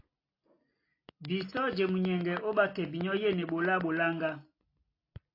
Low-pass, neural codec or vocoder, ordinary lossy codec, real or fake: 5.4 kHz; none; AAC, 32 kbps; real